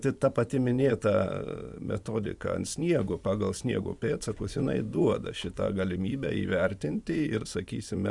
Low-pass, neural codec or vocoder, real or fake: 10.8 kHz; vocoder, 24 kHz, 100 mel bands, Vocos; fake